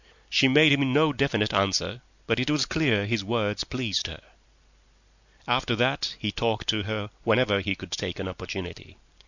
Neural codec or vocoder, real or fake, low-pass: none; real; 7.2 kHz